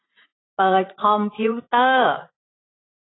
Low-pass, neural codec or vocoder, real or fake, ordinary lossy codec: 7.2 kHz; vocoder, 44.1 kHz, 80 mel bands, Vocos; fake; AAC, 16 kbps